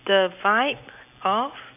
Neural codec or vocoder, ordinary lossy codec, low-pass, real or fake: none; none; 3.6 kHz; real